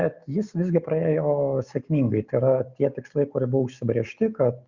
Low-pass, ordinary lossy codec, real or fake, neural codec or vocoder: 7.2 kHz; Opus, 64 kbps; real; none